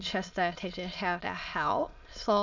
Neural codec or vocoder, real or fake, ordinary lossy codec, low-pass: autoencoder, 22.05 kHz, a latent of 192 numbers a frame, VITS, trained on many speakers; fake; none; 7.2 kHz